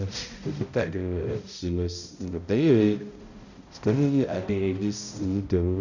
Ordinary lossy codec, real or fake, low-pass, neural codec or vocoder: none; fake; 7.2 kHz; codec, 16 kHz, 0.5 kbps, X-Codec, HuBERT features, trained on balanced general audio